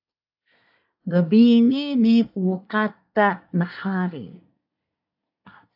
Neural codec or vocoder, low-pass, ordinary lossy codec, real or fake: codec, 24 kHz, 1 kbps, SNAC; 5.4 kHz; AAC, 48 kbps; fake